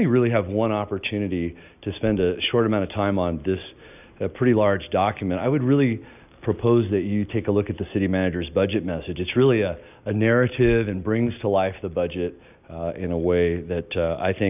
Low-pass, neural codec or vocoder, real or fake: 3.6 kHz; none; real